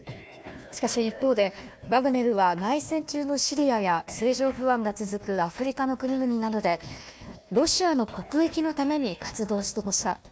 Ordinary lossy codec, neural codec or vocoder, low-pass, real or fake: none; codec, 16 kHz, 1 kbps, FunCodec, trained on Chinese and English, 50 frames a second; none; fake